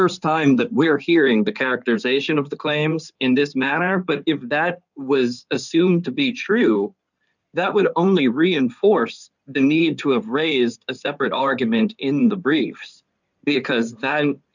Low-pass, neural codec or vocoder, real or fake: 7.2 kHz; codec, 16 kHz in and 24 kHz out, 2.2 kbps, FireRedTTS-2 codec; fake